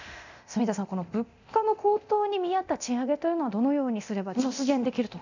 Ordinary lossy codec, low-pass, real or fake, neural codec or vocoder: none; 7.2 kHz; fake; codec, 24 kHz, 0.9 kbps, DualCodec